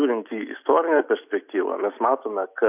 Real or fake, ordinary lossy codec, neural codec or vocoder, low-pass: real; AAC, 32 kbps; none; 3.6 kHz